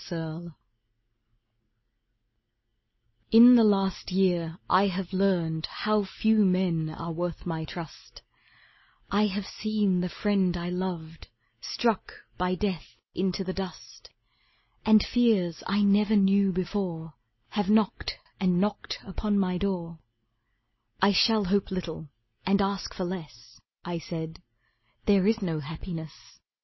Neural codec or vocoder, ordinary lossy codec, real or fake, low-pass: none; MP3, 24 kbps; real; 7.2 kHz